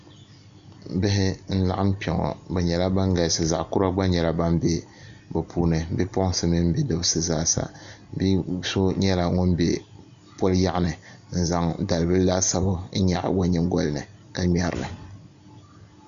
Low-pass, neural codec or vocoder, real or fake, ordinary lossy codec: 7.2 kHz; none; real; MP3, 96 kbps